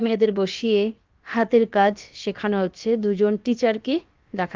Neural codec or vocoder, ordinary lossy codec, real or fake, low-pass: codec, 16 kHz, about 1 kbps, DyCAST, with the encoder's durations; Opus, 32 kbps; fake; 7.2 kHz